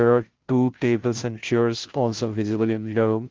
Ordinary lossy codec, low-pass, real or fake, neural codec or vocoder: Opus, 16 kbps; 7.2 kHz; fake; codec, 16 kHz, 0.5 kbps, FunCodec, trained on Chinese and English, 25 frames a second